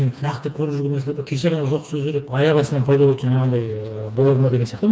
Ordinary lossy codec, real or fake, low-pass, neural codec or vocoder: none; fake; none; codec, 16 kHz, 2 kbps, FreqCodec, smaller model